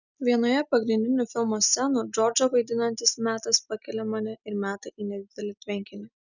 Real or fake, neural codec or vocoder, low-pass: real; none; 7.2 kHz